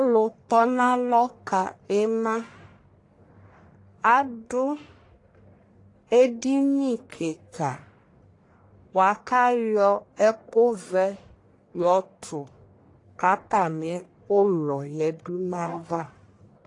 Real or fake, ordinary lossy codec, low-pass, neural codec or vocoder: fake; AAC, 48 kbps; 10.8 kHz; codec, 44.1 kHz, 1.7 kbps, Pupu-Codec